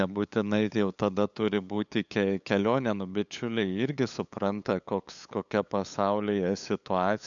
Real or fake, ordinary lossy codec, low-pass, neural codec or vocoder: fake; MP3, 96 kbps; 7.2 kHz; codec, 16 kHz, 8 kbps, FunCodec, trained on LibriTTS, 25 frames a second